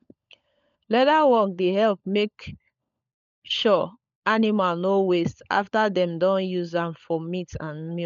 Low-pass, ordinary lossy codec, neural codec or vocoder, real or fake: 7.2 kHz; MP3, 96 kbps; codec, 16 kHz, 16 kbps, FunCodec, trained on LibriTTS, 50 frames a second; fake